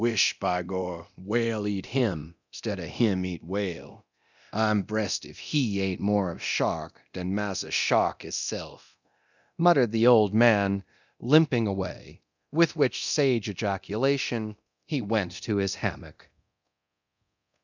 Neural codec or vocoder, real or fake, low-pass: codec, 24 kHz, 0.9 kbps, DualCodec; fake; 7.2 kHz